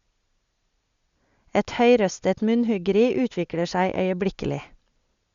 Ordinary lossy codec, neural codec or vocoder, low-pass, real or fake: Opus, 64 kbps; none; 7.2 kHz; real